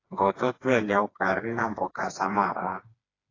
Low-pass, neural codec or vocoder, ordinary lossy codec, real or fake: 7.2 kHz; codec, 16 kHz, 2 kbps, FreqCodec, smaller model; AAC, 32 kbps; fake